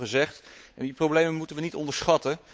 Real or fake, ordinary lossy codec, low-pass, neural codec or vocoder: fake; none; none; codec, 16 kHz, 8 kbps, FunCodec, trained on Chinese and English, 25 frames a second